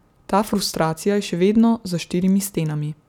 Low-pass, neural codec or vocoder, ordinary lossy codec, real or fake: 19.8 kHz; none; none; real